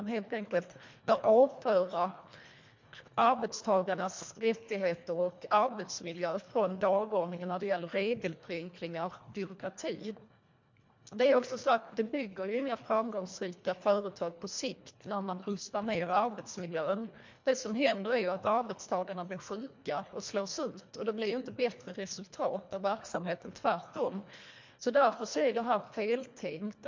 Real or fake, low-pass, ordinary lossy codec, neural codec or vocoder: fake; 7.2 kHz; MP3, 48 kbps; codec, 24 kHz, 1.5 kbps, HILCodec